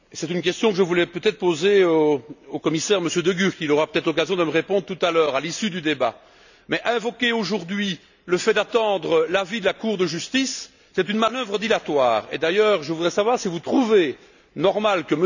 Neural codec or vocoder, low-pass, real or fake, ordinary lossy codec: none; 7.2 kHz; real; none